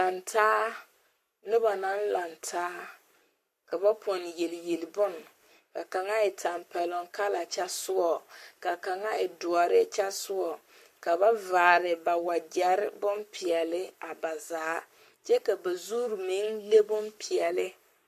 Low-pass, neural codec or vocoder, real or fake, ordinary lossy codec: 14.4 kHz; codec, 44.1 kHz, 7.8 kbps, Pupu-Codec; fake; MP3, 64 kbps